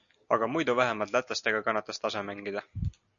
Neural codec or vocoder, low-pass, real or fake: none; 7.2 kHz; real